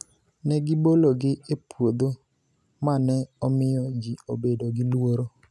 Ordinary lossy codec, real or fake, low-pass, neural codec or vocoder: none; real; none; none